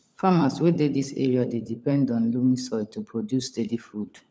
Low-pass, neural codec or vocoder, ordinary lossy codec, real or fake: none; codec, 16 kHz, 16 kbps, FunCodec, trained on LibriTTS, 50 frames a second; none; fake